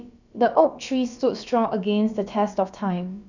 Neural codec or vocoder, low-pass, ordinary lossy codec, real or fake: codec, 16 kHz, about 1 kbps, DyCAST, with the encoder's durations; 7.2 kHz; none; fake